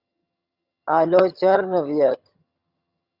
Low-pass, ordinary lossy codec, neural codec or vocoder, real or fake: 5.4 kHz; Opus, 64 kbps; vocoder, 22.05 kHz, 80 mel bands, HiFi-GAN; fake